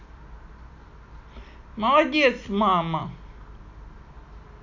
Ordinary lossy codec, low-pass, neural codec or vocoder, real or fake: none; 7.2 kHz; none; real